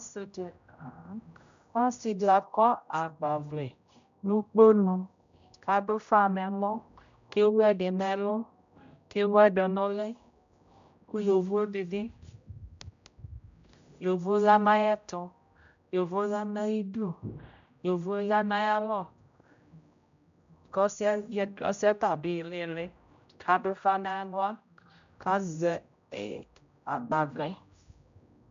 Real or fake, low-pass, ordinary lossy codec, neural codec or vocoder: fake; 7.2 kHz; MP3, 64 kbps; codec, 16 kHz, 0.5 kbps, X-Codec, HuBERT features, trained on general audio